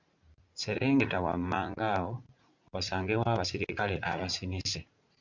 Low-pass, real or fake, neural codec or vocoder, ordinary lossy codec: 7.2 kHz; fake; vocoder, 44.1 kHz, 80 mel bands, Vocos; MP3, 64 kbps